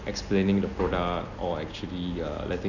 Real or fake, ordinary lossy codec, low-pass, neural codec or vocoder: real; none; 7.2 kHz; none